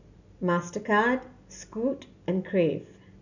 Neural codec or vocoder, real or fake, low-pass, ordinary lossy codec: none; real; 7.2 kHz; none